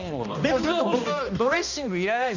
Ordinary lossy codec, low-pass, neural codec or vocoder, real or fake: none; 7.2 kHz; codec, 16 kHz, 1 kbps, X-Codec, HuBERT features, trained on balanced general audio; fake